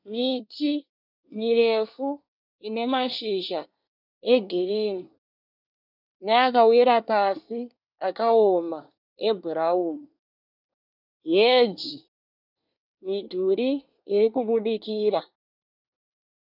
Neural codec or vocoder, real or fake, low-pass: codec, 24 kHz, 1 kbps, SNAC; fake; 5.4 kHz